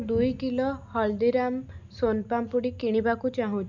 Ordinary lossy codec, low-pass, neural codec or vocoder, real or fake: none; 7.2 kHz; none; real